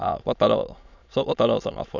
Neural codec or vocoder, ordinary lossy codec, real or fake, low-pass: autoencoder, 22.05 kHz, a latent of 192 numbers a frame, VITS, trained on many speakers; none; fake; 7.2 kHz